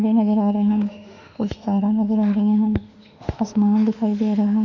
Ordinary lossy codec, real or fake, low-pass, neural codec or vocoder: none; fake; 7.2 kHz; autoencoder, 48 kHz, 32 numbers a frame, DAC-VAE, trained on Japanese speech